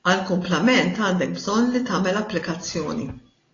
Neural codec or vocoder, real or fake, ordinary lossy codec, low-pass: none; real; AAC, 32 kbps; 7.2 kHz